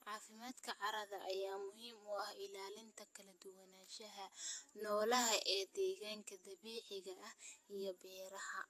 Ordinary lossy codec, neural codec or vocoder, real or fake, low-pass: AAC, 64 kbps; vocoder, 48 kHz, 128 mel bands, Vocos; fake; 14.4 kHz